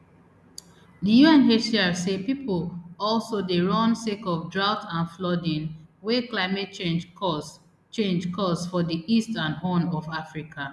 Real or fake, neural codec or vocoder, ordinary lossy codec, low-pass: real; none; none; none